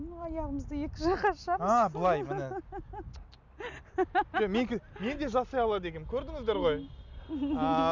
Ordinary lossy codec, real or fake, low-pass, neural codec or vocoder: none; real; 7.2 kHz; none